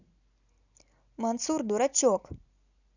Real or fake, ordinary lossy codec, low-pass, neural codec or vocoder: real; none; 7.2 kHz; none